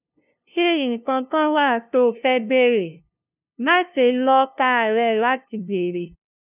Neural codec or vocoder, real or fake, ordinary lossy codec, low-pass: codec, 16 kHz, 0.5 kbps, FunCodec, trained on LibriTTS, 25 frames a second; fake; none; 3.6 kHz